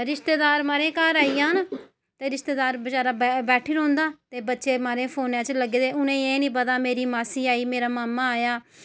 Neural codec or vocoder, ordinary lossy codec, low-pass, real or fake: none; none; none; real